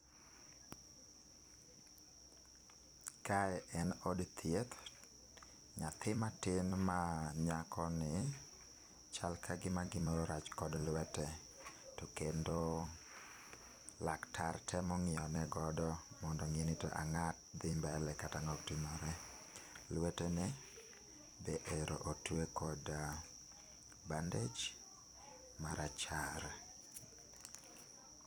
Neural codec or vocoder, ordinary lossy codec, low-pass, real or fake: vocoder, 44.1 kHz, 128 mel bands every 256 samples, BigVGAN v2; none; none; fake